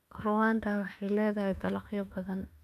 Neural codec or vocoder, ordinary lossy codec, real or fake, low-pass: autoencoder, 48 kHz, 32 numbers a frame, DAC-VAE, trained on Japanese speech; none; fake; 14.4 kHz